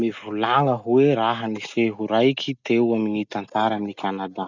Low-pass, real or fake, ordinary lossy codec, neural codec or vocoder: 7.2 kHz; real; Opus, 64 kbps; none